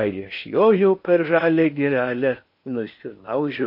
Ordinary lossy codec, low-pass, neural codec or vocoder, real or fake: MP3, 32 kbps; 5.4 kHz; codec, 16 kHz in and 24 kHz out, 0.8 kbps, FocalCodec, streaming, 65536 codes; fake